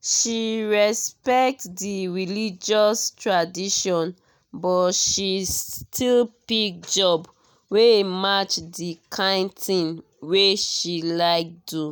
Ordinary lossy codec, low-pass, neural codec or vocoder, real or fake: none; none; none; real